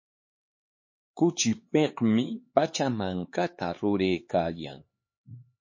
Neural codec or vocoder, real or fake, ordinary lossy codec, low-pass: codec, 16 kHz, 4 kbps, X-Codec, HuBERT features, trained on LibriSpeech; fake; MP3, 32 kbps; 7.2 kHz